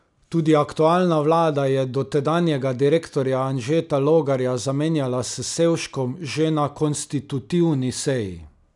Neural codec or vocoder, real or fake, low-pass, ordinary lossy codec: none; real; 10.8 kHz; none